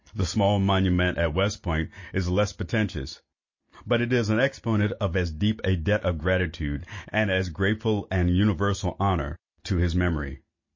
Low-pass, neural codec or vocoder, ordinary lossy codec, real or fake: 7.2 kHz; none; MP3, 32 kbps; real